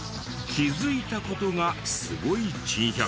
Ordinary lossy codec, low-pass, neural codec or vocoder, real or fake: none; none; none; real